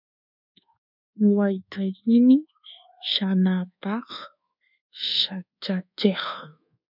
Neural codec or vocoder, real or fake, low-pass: codec, 24 kHz, 1.2 kbps, DualCodec; fake; 5.4 kHz